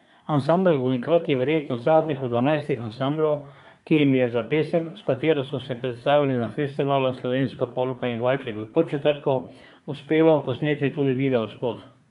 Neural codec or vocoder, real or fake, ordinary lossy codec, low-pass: codec, 24 kHz, 1 kbps, SNAC; fake; none; 10.8 kHz